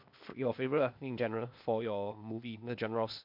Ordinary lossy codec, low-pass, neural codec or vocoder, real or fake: MP3, 48 kbps; 5.4 kHz; codec, 16 kHz, 0.8 kbps, ZipCodec; fake